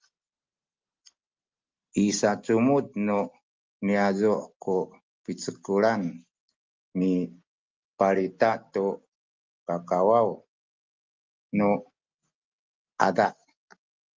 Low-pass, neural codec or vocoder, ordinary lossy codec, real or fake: 7.2 kHz; none; Opus, 24 kbps; real